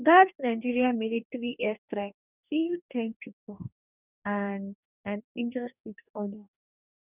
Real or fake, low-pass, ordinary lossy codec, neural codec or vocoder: fake; 3.6 kHz; none; codec, 44.1 kHz, 2.6 kbps, DAC